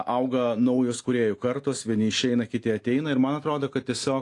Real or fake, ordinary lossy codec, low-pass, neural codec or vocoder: real; AAC, 48 kbps; 10.8 kHz; none